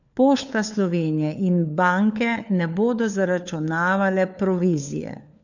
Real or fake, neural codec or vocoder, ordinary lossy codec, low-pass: fake; codec, 16 kHz, 4 kbps, FunCodec, trained on LibriTTS, 50 frames a second; none; 7.2 kHz